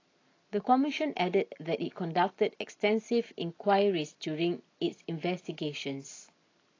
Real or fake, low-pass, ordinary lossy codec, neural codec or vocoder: real; 7.2 kHz; AAC, 32 kbps; none